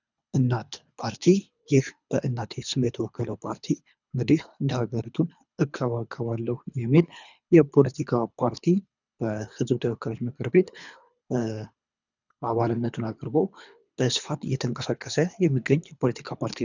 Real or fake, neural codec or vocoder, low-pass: fake; codec, 24 kHz, 3 kbps, HILCodec; 7.2 kHz